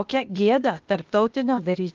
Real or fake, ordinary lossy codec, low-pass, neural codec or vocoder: fake; Opus, 24 kbps; 7.2 kHz; codec, 16 kHz, 0.8 kbps, ZipCodec